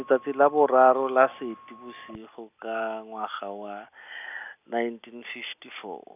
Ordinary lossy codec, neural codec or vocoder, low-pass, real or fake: none; none; 3.6 kHz; real